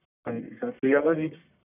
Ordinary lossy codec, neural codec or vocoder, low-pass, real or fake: none; codec, 44.1 kHz, 1.7 kbps, Pupu-Codec; 3.6 kHz; fake